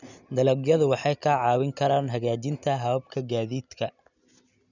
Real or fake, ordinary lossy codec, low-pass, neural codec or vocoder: real; none; 7.2 kHz; none